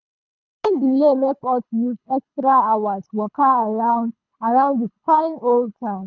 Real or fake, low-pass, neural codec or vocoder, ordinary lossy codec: fake; 7.2 kHz; codec, 24 kHz, 3 kbps, HILCodec; none